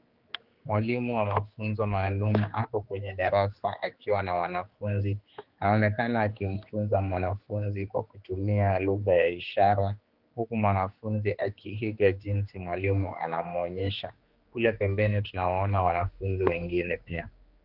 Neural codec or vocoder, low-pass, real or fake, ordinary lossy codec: codec, 16 kHz, 2 kbps, X-Codec, HuBERT features, trained on general audio; 5.4 kHz; fake; Opus, 32 kbps